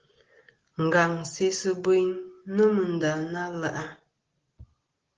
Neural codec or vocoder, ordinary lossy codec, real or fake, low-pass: none; Opus, 16 kbps; real; 7.2 kHz